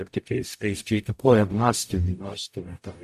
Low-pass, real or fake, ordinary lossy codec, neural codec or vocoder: 14.4 kHz; fake; AAC, 96 kbps; codec, 44.1 kHz, 0.9 kbps, DAC